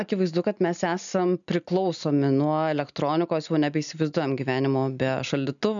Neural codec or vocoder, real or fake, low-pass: none; real; 7.2 kHz